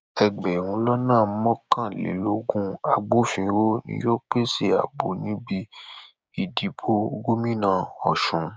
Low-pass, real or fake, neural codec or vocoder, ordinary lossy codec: none; fake; codec, 16 kHz, 6 kbps, DAC; none